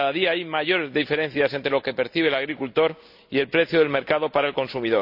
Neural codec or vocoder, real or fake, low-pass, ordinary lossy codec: none; real; 5.4 kHz; none